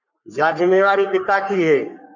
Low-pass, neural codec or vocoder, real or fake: 7.2 kHz; codec, 44.1 kHz, 3.4 kbps, Pupu-Codec; fake